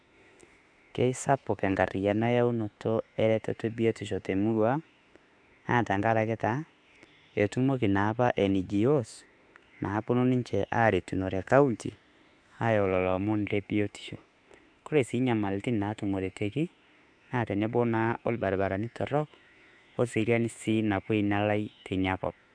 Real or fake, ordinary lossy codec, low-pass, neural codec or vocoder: fake; AAC, 64 kbps; 9.9 kHz; autoencoder, 48 kHz, 32 numbers a frame, DAC-VAE, trained on Japanese speech